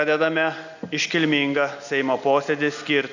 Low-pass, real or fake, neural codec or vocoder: 7.2 kHz; real; none